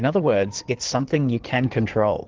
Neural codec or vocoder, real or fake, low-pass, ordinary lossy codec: codec, 16 kHz in and 24 kHz out, 2.2 kbps, FireRedTTS-2 codec; fake; 7.2 kHz; Opus, 16 kbps